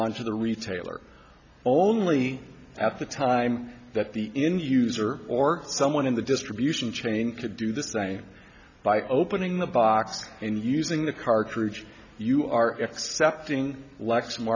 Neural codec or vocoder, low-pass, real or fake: none; 7.2 kHz; real